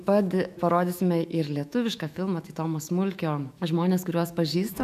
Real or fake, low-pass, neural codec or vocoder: fake; 14.4 kHz; autoencoder, 48 kHz, 128 numbers a frame, DAC-VAE, trained on Japanese speech